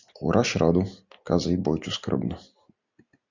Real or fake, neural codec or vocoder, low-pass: real; none; 7.2 kHz